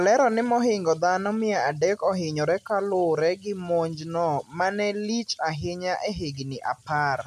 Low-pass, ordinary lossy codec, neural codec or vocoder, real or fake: 14.4 kHz; none; none; real